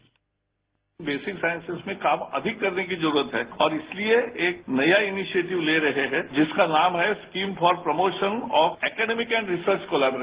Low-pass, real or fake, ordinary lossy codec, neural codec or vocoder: 3.6 kHz; real; Opus, 16 kbps; none